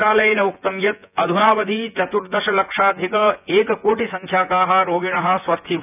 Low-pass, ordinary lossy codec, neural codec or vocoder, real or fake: 3.6 kHz; none; vocoder, 24 kHz, 100 mel bands, Vocos; fake